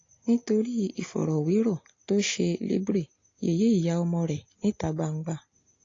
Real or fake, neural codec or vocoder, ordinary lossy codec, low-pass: real; none; AAC, 32 kbps; 7.2 kHz